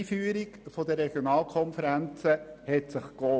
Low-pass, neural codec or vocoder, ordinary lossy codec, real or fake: none; none; none; real